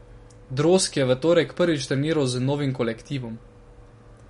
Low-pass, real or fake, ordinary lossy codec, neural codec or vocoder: 10.8 kHz; real; MP3, 48 kbps; none